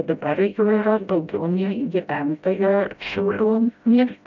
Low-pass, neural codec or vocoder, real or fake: 7.2 kHz; codec, 16 kHz, 0.5 kbps, FreqCodec, smaller model; fake